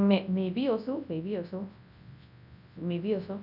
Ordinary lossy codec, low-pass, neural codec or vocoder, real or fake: none; 5.4 kHz; codec, 24 kHz, 0.9 kbps, WavTokenizer, large speech release; fake